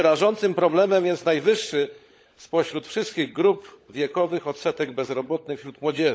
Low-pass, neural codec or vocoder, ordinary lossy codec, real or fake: none; codec, 16 kHz, 16 kbps, FunCodec, trained on LibriTTS, 50 frames a second; none; fake